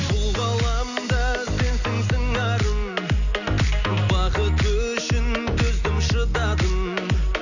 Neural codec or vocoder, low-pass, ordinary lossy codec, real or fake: none; 7.2 kHz; none; real